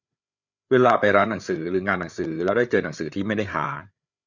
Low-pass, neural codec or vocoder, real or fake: 7.2 kHz; codec, 16 kHz, 8 kbps, FreqCodec, larger model; fake